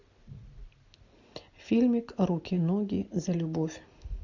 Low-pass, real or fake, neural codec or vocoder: 7.2 kHz; real; none